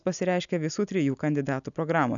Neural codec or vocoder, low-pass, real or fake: none; 7.2 kHz; real